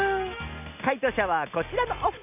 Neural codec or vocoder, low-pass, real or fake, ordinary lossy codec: none; 3.6 kHz; real; AAC, 32 kbps